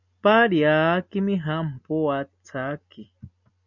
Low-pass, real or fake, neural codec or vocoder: 7.2 kHz; real; none